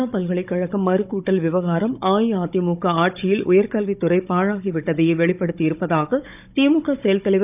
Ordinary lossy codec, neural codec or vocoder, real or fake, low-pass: none; codec, 16 kHz, 16 kbps, FunCodec, trained on Chinese and English, 50 frames a second; fake; 3.6 kHz